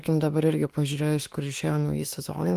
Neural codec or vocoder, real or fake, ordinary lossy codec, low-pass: autoencoder, 48 kHz, 32 numbers a frame, DAC-VAE, trained on Japanese speech; fake; Opus, 24 kbps; 14.4 kHz